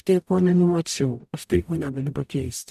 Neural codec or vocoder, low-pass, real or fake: codec, 44.1 kHz, 0.9 kbps, DAC; 14.4 kHz; fake